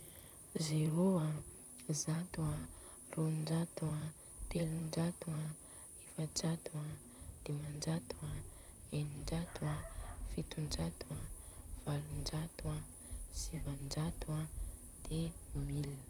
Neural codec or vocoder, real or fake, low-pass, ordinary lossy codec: vocoder, 44.1 kHz, 128 mel bands, Pupu-Vocoder; fake; none; none